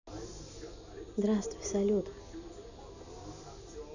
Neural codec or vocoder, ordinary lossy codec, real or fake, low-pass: none; none; real; 7.2 kHz